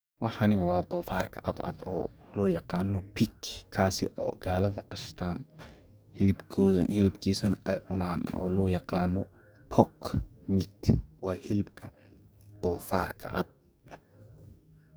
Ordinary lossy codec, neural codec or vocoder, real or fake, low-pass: none; codec, 44.1 kHz, 2.6 kbps, DAC; fake; none